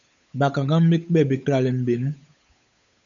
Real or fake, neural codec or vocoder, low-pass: fake; codec, 16 kHz, 8 kbps, FunCodec, trained on Chinese and English, 25 frames a second; 7.2 kHz